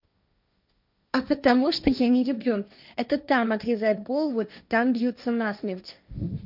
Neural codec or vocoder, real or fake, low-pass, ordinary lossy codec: codec, 16 kHz, 1.1 kbps, Voila-Tokenizer; fake; 5.4 kHz; none